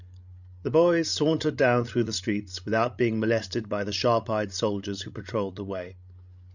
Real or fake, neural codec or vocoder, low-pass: fake; codec, 16 kHz, 16 kbps, FreqCodec, larger model; 7.2 kHz